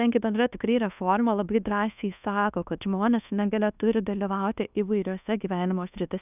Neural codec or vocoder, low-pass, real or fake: codec, 24 kHz, 1.2 kbps, DualCodec; 3.6 kHz; fake